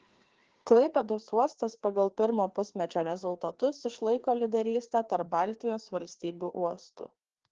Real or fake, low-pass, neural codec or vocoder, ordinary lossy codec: fake; 7.2 kHz; codec, 16 kHz, 2 kbps, FreqCodec, larger model; Opus, 16 kbps